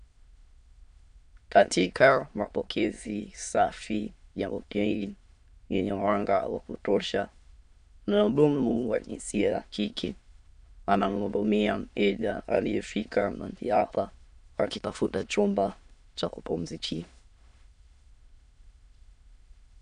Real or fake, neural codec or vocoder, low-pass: fake; autoencoder, 22.05 kHz, a latent of 192 numbers a frame, VITS, trained on many speakers; 9.9 kHz